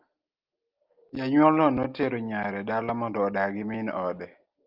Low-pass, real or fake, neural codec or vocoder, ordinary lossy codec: 5.4 kHz; real; none; Opus, 32 kbps